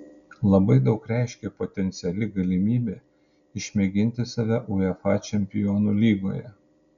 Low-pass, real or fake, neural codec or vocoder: 7.2 kHz; real; none